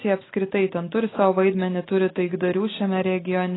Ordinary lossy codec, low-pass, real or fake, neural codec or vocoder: AAC, 16 kbps; 7.2 kHz; real; none